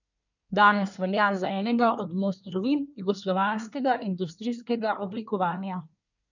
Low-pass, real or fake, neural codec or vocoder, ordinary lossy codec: 7.2 kHz; fake; codec, 24 kHz, 1 kbps, SNAC; none